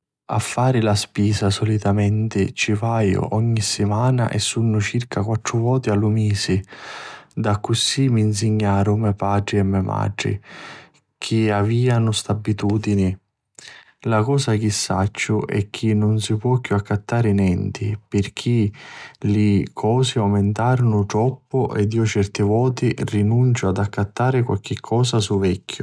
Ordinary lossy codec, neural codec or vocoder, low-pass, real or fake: none; none; none; real